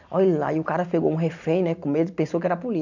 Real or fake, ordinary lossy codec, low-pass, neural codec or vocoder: real; none; 7.2 kHz; none